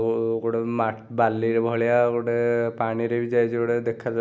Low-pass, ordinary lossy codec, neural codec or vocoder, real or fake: none; none; none; real